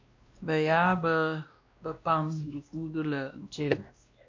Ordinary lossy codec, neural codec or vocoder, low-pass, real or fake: MP3, 48 kbps; codec, 16 kHz, 1 kbps, X-Codec, WavLM features, trained on Multilingual LibriSpeech; 7.2 kHz; fake